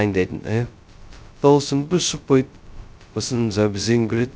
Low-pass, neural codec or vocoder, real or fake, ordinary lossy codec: none; codec, 16 kHz, 0.2 kbps, FocalCodec; fake; none